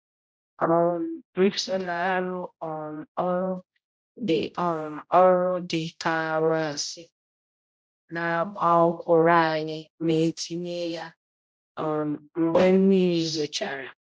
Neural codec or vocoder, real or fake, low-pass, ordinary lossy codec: codec, 16 kHz, 0.5 kbps, X-Codec, HuBERT features, trained on general audio; fake; none; none